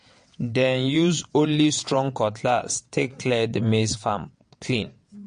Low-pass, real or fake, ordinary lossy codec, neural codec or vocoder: 9.9 kHz; fake; MP3, 48 kbps; vocoder, 22.05 kHz, 80 mel bands, WaveNeXt